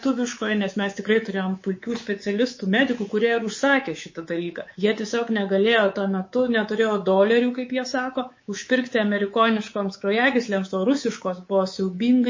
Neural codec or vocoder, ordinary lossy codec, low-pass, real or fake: codec, 24 kHz, 3.1 kbps, DualCodec; MP3, 32 kbps; 7.2 kHz; fake